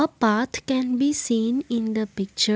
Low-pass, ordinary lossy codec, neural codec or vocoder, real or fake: none; none; none; real